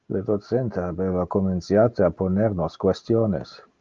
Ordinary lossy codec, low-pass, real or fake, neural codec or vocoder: Opus, 24 kbps; 7.2 kHz; real; none